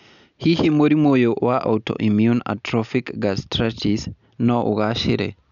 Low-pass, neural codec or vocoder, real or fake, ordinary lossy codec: 7.2 kHz; none; real; none